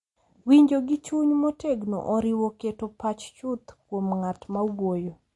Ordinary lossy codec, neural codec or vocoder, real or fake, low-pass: MP3, 48 kbps; none; real; 10.8 kHz